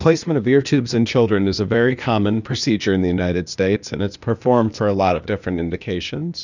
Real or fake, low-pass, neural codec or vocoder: fake; 7.2 kHz; codec, 16 kHz, 0.8 kbps, ZipCodec